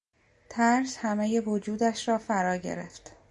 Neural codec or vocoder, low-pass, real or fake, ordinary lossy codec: none; 10.8 kHz; real; Opus, 64 kbps